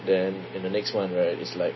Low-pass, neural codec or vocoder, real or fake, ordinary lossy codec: 7.2 kHz; none; real; MP3, 24 kbps